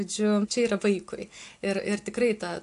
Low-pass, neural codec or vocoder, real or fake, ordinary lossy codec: 10.8 kHz; none; real; AAC, 64 kbps